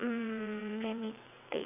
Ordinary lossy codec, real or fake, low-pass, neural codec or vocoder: none; fake; 3.6 kHz; vocoder, 22.05 kHz, 80 mel bands, WaveNeXt